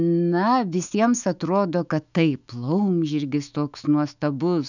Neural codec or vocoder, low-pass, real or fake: autoencoder, 48 kHz, 128 numbers a frame, DAC-VAE, trained on Japanese speech; 7.2 kHz; fake